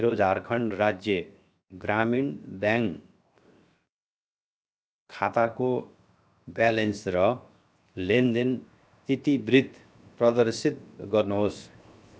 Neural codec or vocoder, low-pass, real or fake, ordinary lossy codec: codec, 16 kHz, 0.7 kbps, FocalCodec; none; fake; none